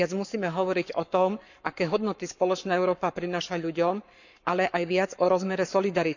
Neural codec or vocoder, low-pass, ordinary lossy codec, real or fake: codec, 44.1 kHz, 7.8 kbps, Pupu-Codec; 7.2 kHz; none; fake